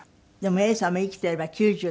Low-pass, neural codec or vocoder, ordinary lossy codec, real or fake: none; none; none; real